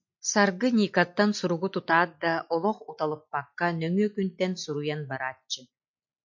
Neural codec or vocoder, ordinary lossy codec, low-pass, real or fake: none; MP3, 48 kbps; 7.2 kHz; real